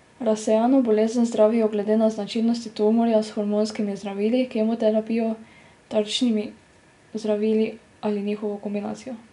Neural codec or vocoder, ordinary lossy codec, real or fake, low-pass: none; none; real; 10.8 kHz